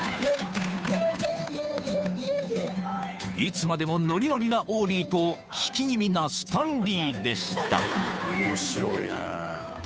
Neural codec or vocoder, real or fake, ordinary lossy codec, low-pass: codec, 16 kHz, 2 kbps, FunCodec, trained on Chinese and English, 25 frames a second; fake; none; none